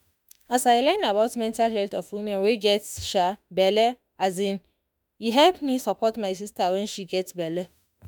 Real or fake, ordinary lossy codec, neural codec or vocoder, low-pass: fake; none; autoencoder, 48 kHz, 32 numbers a frame, DAC-VAE, trained on Japanese speech; none